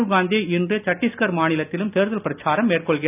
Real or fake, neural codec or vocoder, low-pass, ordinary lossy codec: real; none; 3.6 kHz; none